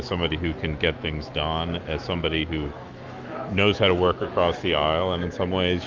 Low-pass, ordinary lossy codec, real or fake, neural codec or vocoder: 7.2 kHz; Opus, 24 kbps; fake; autoencoder, 48 kHz, 128 numbers a frame, DAC-VAE, trained on Japanese speech